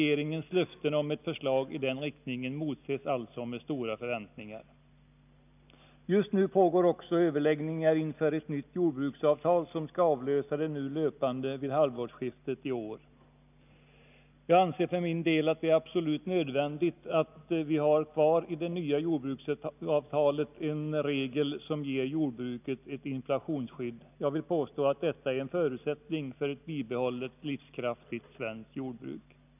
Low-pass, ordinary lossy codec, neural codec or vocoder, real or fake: 3.6 kHz; none; none; real